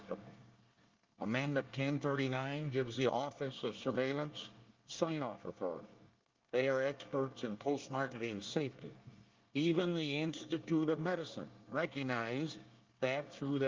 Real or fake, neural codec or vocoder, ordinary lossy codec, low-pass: fake; codec, 24 kHz, 1 kbps, SNAC; Opus, 24 kbps; 7.2 kHz